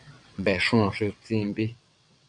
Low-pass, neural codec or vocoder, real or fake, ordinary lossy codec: 9.9 kHz; vocoder, 22.05 kHz, 80 mel bands, WaveNeXt; fake; AAC, 64 kbps